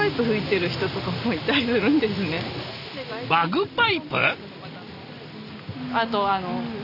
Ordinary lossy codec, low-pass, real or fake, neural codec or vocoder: none; 5.4 kHz; real; none